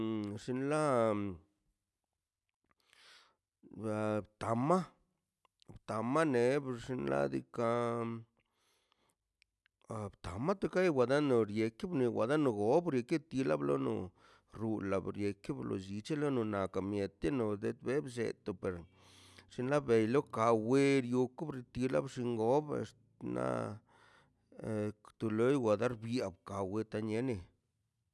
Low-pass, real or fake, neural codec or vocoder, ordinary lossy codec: none; real; none; none